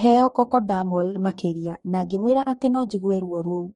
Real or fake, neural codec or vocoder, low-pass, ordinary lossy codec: fake; codec, 44.1 kHz, 2.6 kbps, DAC; 19.8 kHz; MP3, 48 kbps